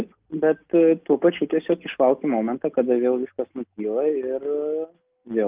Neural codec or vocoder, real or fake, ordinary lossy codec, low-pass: none; real; Opus, 24 kbps; 3.6 kHz